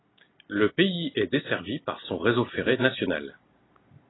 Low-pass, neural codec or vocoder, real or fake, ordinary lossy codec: 7.2 kHz; none; real; AAC, 16 kbps